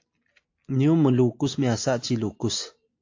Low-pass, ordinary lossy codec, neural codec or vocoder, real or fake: 7.2 kHz; AAC, 48 kbps; none; real